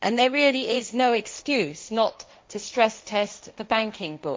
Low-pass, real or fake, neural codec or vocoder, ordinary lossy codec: none; fake; codec, 16 kHz, 1.1 kbps, Voila-Tokenizer; none